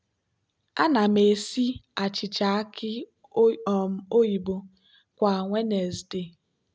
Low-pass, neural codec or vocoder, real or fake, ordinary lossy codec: none; none; real; none